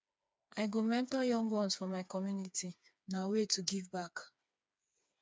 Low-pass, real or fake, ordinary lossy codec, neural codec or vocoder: none; fake; none; codec, 16 kHz, 4 kbps, FreqCodec, smaller model